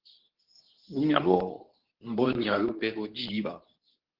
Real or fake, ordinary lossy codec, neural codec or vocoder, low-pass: fake; Opus, 16 kbps; codec, 24 kHz, 0.9 kbps, WavTokenizer, medium speech release version 2; 5.4 kHz